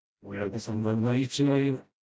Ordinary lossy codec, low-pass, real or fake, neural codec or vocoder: none; none; fake; codec, 16 kHz, 0.5 kbps, FreqCodec, smaller model